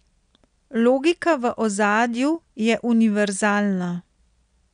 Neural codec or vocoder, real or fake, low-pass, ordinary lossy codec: none; real; 9.9 kHz; none